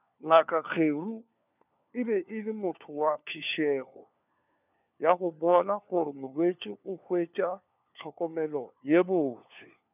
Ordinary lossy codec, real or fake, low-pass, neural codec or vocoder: none; fake; 3.6 kHz; codec, 16 kHz, 4 kbps, FunCodec, trained on LibriTTS, 50 frames a second